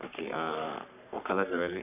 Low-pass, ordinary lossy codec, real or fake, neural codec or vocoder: 3.6 kHz; none; fake; codec, 44.1 kHz, 3.4 kbps, Pupu-Codec